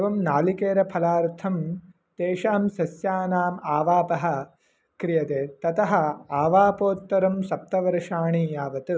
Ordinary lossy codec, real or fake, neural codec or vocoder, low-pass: none; real; none; none